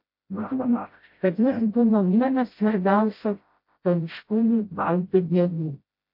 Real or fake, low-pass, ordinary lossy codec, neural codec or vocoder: fake; 5.4 kHz; MP3, 32 kbps; codec, 16 kHz, 0.5 kbps, FreqCodec, smaller model